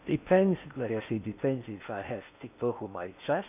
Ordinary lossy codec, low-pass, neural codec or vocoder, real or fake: none; 3.6 kHz; codec, 16 kHz in and 24 kHz out, 0.6 kbps, FocalCodec, streaming, 2048 codes; fake